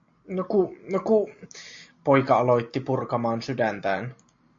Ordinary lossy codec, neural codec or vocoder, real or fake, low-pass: MP3, 48 kbps; none; real; 7.2 kHz